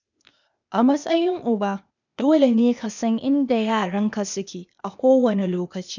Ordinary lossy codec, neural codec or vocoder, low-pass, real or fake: none; codec, 16 kHz, 0.8 kbps, ZipCodec; 7.2 kHz; fake